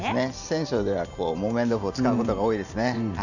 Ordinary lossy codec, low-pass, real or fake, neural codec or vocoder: none; 7.2 kHz; fake; vocoder, 44.1 kHz, 128 mel bands every 512 samples, BigVGAN v2